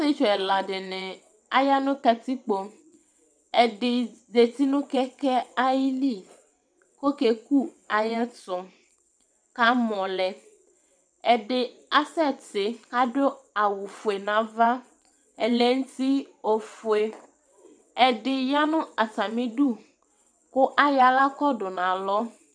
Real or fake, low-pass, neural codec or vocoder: fake; 9.9 kHz; vocoder, 22.05 kHz, 80 mel bands, WaveNeXt